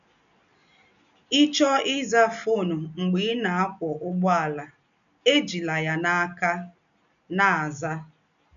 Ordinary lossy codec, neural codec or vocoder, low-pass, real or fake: none; none; 7.2 kHz; real